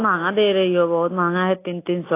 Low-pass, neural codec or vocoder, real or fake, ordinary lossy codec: 3.6 kHz; none; real; AAC, 24 kbps